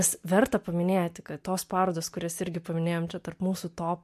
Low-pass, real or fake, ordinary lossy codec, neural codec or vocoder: 14.4 kHz; real; MP3, 64 kbps; none